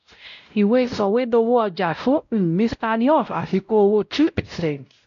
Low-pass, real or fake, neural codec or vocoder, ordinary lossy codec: 7.2 kHz; fake; codec, 16 kHz, 0.5 kbps, X-Codec, WavLM features, trained on Multilingual LibriSpeech; MP3, 64 kbps